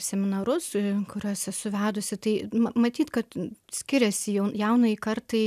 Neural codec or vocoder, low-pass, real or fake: none; 14.4 kHz; real